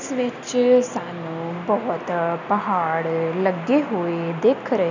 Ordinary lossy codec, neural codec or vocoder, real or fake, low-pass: none; none; real; 7.2 kHz